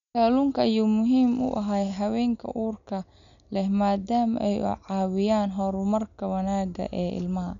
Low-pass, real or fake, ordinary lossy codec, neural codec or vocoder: 7.2 kHz; real; none; none